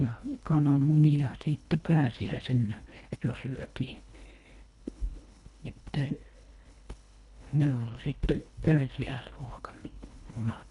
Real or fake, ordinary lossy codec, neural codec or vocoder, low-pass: fake; none; codec, 24 kHz, 1.5 kbps, HILCodec; 10.8 kHz